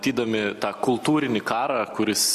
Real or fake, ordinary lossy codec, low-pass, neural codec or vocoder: real; MP3, 64 kbps; 14.4 kHz; none